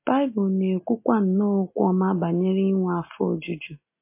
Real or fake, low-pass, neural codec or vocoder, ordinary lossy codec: real; 3.6 kHz; none; MP3, 24 kbps